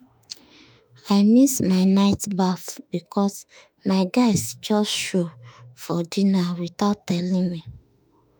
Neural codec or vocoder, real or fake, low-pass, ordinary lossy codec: autoencoder, 48 kHz, 32 numbers a frame, DAC-VAE, trained on Japanese speech; fake; none; none